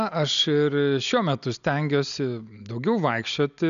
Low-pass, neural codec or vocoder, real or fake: 7.2 kHz; none; real